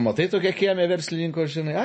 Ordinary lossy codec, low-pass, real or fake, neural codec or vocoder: MP3, 32 kbps; 9.9 kHz; real; none